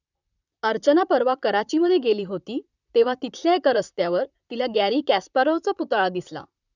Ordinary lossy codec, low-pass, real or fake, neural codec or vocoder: none; 7.2 kHz; fake; vocoder, 44.1 kHz, 128 mel bands, Pupu-Vocoder